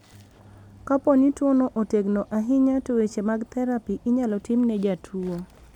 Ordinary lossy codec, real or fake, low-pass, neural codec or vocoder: none; real; 19.8 kHz; none